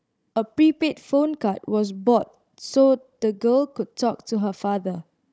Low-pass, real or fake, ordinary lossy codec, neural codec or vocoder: none; fake; none; codec, 16 kHz, 16 kbps, FunCodec, trained on Chinese and English, 50 frames a second